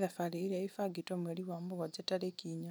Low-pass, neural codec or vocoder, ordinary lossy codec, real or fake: none; none; none; real